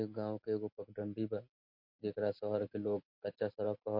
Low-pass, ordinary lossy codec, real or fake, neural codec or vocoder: 5.4 kHz; MP3, 32 kbps; real; none